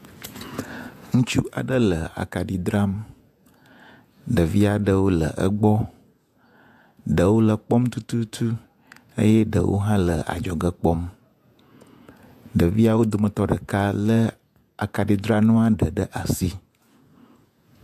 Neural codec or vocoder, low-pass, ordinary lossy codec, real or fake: vocoder, 48 kHz, 128 mel bands, Vocos; 14.4 kHz; AAC, 96 kbps; fake